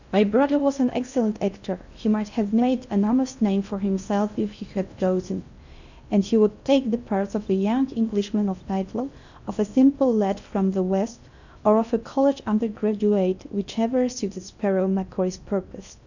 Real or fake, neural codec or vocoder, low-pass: fake; codec, 16 kHz in and 24 kHz out, 0.6 kbps, FocalCodec, streaming, 4096 codes; 7.2 kHz